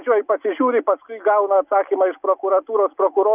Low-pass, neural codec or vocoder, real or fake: 3.6 kHz; none; real